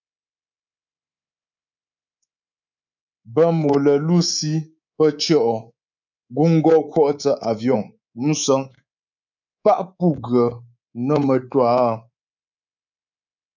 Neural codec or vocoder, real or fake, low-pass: codec, 24 kHz, 3.1 kbps, DualCodec; fake; 7.2 kHz